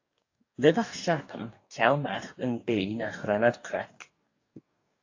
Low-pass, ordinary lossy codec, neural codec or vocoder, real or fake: 7.2 kHz; AAC, 48 kbps; codec, 44.1 kHz, 2.6 kbps, DAC; fake